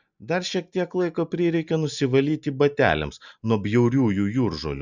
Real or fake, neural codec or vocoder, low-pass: real; none; 7.2 kHz